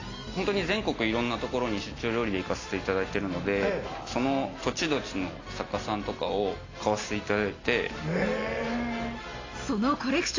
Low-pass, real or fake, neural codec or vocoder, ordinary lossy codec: 7.2 kHz; real; none; AAC, 32 kbps